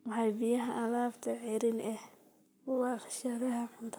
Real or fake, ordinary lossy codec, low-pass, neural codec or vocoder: fake; none; none; codec, 44.1 kHz, 7.8 kbps, Pupu-Codec